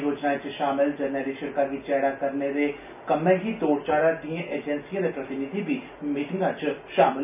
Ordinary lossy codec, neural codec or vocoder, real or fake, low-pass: none; none; real; 3.6 kHz